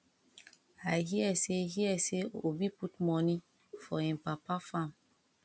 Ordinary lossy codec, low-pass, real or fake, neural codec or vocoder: none; none; real; none